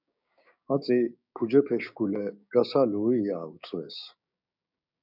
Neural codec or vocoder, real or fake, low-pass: codec, 16 kHz, 6 kbps, DAC; fake; 5.4 kHz